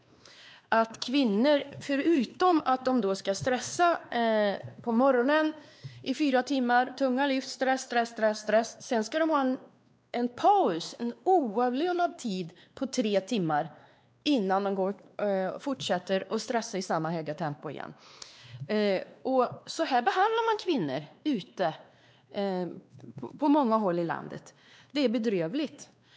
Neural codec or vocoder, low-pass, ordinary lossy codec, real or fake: codec, 16 kHz, 2 kbps, X-Codec, WavLM features, trained on Multilingual LibriSpeech; none; none; fake